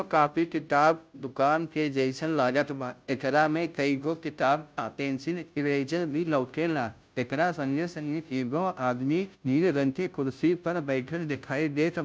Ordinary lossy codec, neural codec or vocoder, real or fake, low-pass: none; codec, 16 kHz, 0.5 kbps, FunCodec, trained on Chinese and English, 25 frames a second; fake; none